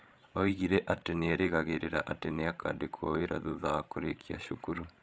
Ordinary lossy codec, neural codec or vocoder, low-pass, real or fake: none; codec, 16 kHz, 16 kbps, FreqCodec, larger model; none; fake